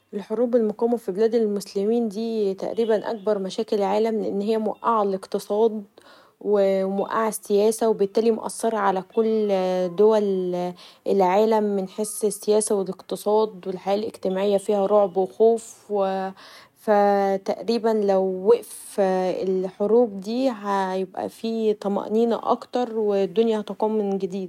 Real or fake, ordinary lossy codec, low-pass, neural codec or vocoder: real; none; 19.8 kHz; none